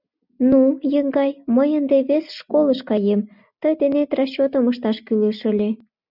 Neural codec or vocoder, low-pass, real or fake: none; 5.4 kHz; real